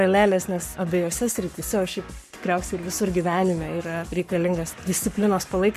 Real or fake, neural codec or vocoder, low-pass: fake; codec, 44.1 kHz, 7.8 kbps, Pupu-Codec; 14.4 kHz